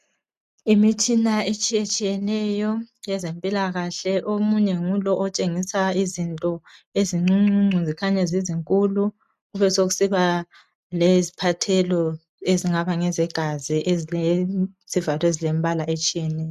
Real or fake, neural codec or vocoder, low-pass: real; none; 14.4 kHz